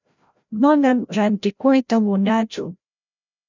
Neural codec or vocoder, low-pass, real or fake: codec, 16 kHz, 0.5 kbps, FreqCodec, larger model; 7.2 kHz; fake